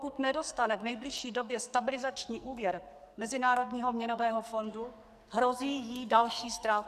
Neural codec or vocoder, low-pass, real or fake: codec, 44.1 kHz, 2.6 kbps, SNAC; 14.4 kHz; fake